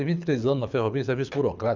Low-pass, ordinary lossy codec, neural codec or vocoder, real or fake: 7.2 kHz; none; codec, 24 kHz, 6 kbps, HILCodec; fake